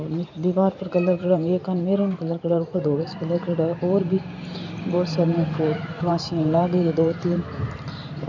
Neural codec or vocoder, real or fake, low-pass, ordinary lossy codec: vocoder, 44.1 kHz, 128 mel bands every 512 samples, BigVGAN v2; fake; 7.2 kHz; none